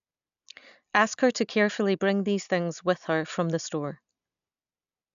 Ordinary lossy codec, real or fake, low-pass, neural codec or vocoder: none; real; 7.2 kHz; none